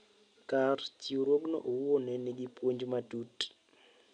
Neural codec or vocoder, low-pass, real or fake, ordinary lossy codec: none; 9.9 kHz; real; none